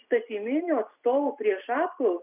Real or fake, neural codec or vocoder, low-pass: real; none; 3.6 kHz